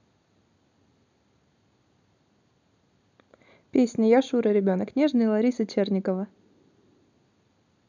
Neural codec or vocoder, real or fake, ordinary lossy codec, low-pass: none; real; none; 7.2 kHz